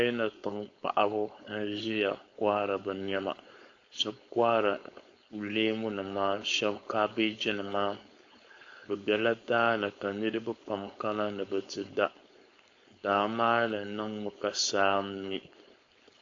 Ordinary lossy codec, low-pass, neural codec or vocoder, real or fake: AAC, 48 kbps; 7.2 kHz; codec, 16 kHz, 4.8 kbps, FACodec; fake